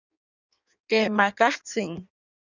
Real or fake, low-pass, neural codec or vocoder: fake; 7.2 kHz; codec, 16 kHz in and 24 kHz out, 1.1 kbps, FireRedTTS-2 codec